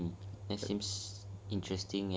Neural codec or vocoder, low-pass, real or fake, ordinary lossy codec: none; none; real; none